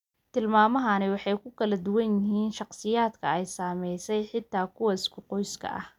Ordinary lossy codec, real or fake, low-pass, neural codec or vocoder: none; real; 19.8 kHz; none